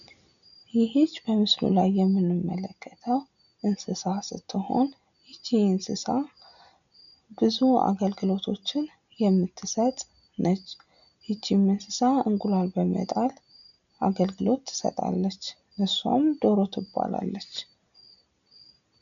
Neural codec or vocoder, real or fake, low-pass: none; real; 7.2 kHz